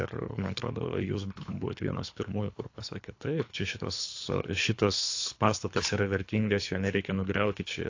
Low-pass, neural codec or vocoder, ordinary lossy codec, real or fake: 7.2 kHz; codec, 24 kHz, 3 kbps, HILCodec; AAC, 48 kbps; fake